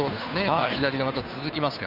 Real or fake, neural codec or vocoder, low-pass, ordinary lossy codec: fake; codec, 16 kHz, 2 kbps, FunCodec, trained on Chinese and English, 25 frames a second; 5.4 kHz; none